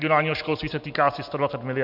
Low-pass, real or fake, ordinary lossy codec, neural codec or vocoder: 5.4 kHz; real; MP3, 48 kbps; none